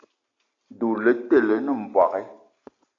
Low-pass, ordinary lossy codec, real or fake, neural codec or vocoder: 7.2 kHz; AAC, 64 kbps; real; none